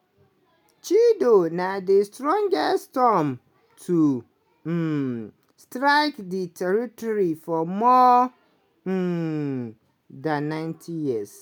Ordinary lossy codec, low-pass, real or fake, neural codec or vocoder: none; none; real; none